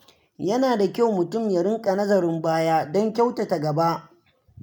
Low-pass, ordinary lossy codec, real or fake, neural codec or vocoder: none; none; real; none